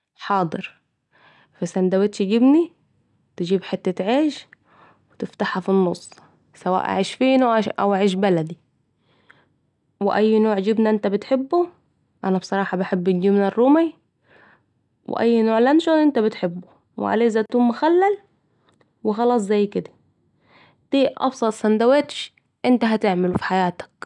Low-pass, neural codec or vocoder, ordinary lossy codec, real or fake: 10.8 kHz; none; none; real